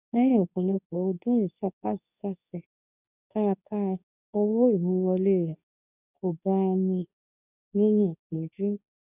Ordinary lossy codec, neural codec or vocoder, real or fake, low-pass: none; codec, 24 kHz, 0.9 kbps, WavTokenizer, medium speech release version 2; fake; 3.6 kHz